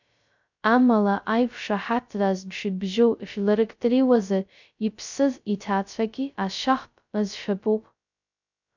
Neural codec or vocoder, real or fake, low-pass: codec, 16 kHz, 0.2 kbps, FocalCodec; fake; 7.2 kHz